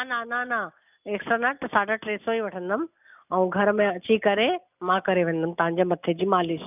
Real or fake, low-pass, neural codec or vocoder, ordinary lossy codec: real; 3.6 kHz; none; none